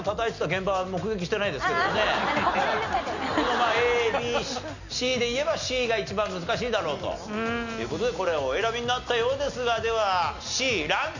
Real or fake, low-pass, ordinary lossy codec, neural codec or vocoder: real; 7.2 kHz; none; none